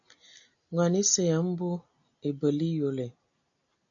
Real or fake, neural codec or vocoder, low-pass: real; none; 7.2 kHz